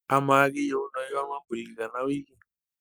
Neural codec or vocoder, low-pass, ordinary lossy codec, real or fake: codec, 44.1 kHz, 7.8 kbps, Pupu-Codec; none; none; fake